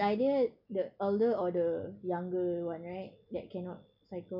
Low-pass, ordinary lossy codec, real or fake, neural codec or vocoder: 5.4 kHz; none; real; none